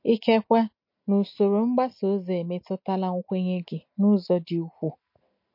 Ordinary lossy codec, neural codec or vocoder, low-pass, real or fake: MP3, 32 kbps; none; 5.4 kHz; real